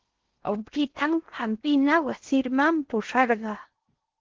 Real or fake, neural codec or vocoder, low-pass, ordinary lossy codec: fake; codec, 16 kHz in and 24 kHz out, 0.8 kbps, FocalCodec, streaming, 65536 codes; 7.2 kHz; Opus, 16 kbps